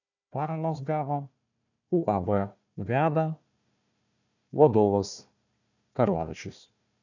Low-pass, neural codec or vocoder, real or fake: 7.2 kHz; codec, 16 kHz, 1 kbps, FunCodec, trained on Chinese and English, 50 frames a second; fake